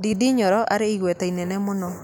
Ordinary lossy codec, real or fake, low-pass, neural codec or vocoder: none; real; none; none